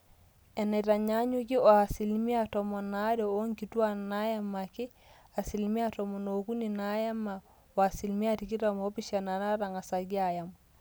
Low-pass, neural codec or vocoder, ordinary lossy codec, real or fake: none; none; none; real